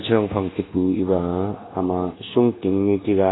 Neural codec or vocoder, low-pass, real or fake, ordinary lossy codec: codec, 24 kHz, 1.2 kbps, DualCodec; 7.2 kHz; fake; AAC, 16 kbps